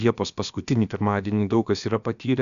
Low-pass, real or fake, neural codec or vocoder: 7.2 kHz; fake; codec, 16 kHz, about 1 kbps, DyCAST, with the encoder's durations